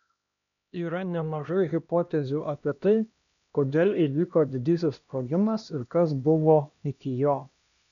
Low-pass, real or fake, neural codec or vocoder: 7.2 kHz; fake; codec, 16 kHz, 1 kbps, X-Codec, HuBERT features, trained on LibriSpeech